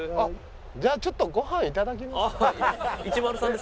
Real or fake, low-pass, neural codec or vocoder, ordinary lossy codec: real; none; none; none